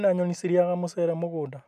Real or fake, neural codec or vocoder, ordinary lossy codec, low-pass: real; none; MP3, 96 kbps; 14.4 kHz